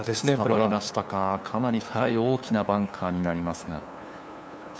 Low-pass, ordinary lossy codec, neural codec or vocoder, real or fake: none; none; codec, 16 kHz, 2 kbps, FunCodec, trained on LibriTTS, 25 frames a second; fake